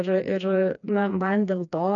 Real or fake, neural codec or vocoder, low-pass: fake; codec, 16 kHz, 2 kbps, FreqCodec, smaller model; 7.2 kHz